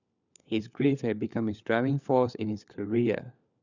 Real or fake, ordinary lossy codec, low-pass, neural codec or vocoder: fake; none; 7.2 kHz; codec, 16 kHz, 4 kbps, FunCodec, trained on LibriTTS, 50 frames a second